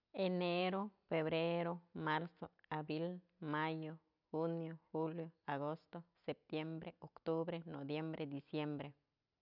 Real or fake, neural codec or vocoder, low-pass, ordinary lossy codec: real; none; 5.4 kHz; none